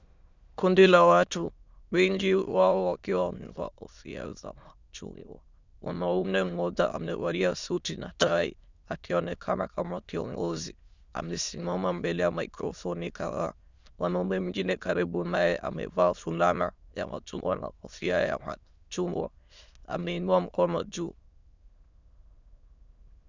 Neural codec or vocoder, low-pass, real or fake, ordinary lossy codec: autoencoder, 22.05 kHz, a latent of 192 numbers a frame, VITS, trained on many speakers; 7.2 kHz; fake; Opus, 64 kbps